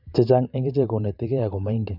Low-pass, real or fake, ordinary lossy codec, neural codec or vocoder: 5.4 kHz; fake; none; vocoder, 44.1 kHz, 128 mel bands every 512 samples, BigVGAN v2